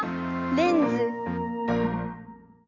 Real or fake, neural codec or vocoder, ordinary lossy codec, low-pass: real; none; none; 7.2 kHz